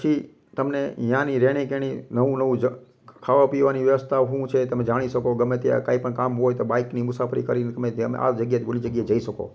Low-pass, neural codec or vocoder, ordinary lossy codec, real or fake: none; none; none; real